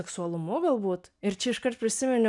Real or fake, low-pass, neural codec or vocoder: real; 10.8 kHz; none